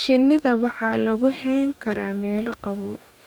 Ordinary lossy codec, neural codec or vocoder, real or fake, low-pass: none; codec, 44.1 kHz, 2.6 kbps, DAC; fake; 19.8 kHz